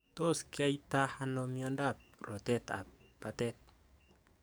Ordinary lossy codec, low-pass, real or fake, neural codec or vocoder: none; none; fake; codec, 44.1 kHz, 7.8 kbps, DAC